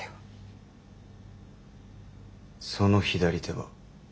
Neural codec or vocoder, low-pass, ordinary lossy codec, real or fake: none; none; none; real